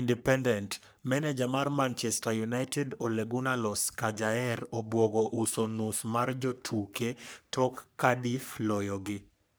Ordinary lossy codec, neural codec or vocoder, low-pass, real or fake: none; codec, 44.1 kHz, 3.4 kbps, Pupu-Codec; none; fake